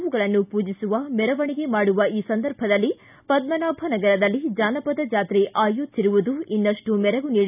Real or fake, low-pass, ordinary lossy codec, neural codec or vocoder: real; 3.6 kHz; none; none